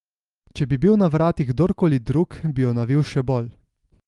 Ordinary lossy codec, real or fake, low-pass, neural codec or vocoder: Opus, 24 kbps; real; 10.8 kHz; none